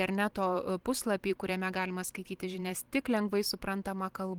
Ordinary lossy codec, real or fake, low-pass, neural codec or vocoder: Opus, 24 kbps; fake; 19.8 kHz; vocoder, 44.1 kHz, 128 mel bands every 512 samples, BigVGAN v2